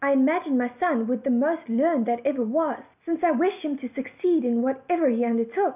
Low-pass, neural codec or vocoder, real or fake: 3.6 kHz; none; real